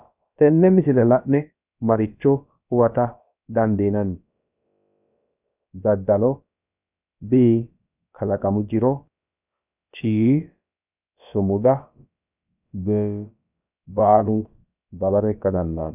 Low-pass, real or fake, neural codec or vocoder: 3.6 kHz; fake; codec, 16 kHz, about 1 kbps, DyCAST, with the encoder's durations